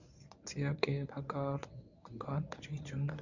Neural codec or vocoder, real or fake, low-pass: codec, 24 kHz, 0.9 kbps, WavTokenizer, medium speech release version 1; fake; 7.2 kHz